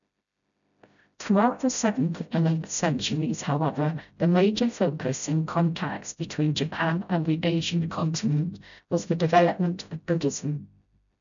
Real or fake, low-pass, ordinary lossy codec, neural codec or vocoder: fake; 7.2 kHz; none; codec, 16 kHz, 0.5 kbps, FreqCodec, smaller model